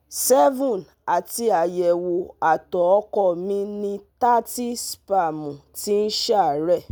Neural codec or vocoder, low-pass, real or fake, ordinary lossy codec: none; none; real; none